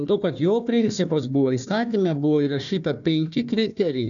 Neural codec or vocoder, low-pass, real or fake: codec, 16 kHz, 1 kbps, FunCodec, trained on Chinese and English, 50 frames a second; 7.2 kHz; fake